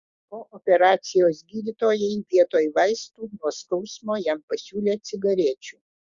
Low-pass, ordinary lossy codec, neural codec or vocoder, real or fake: 7.2 kHz; Opus, 64 kbps; codec, 16 kHz, 6 kbps, DAC; fake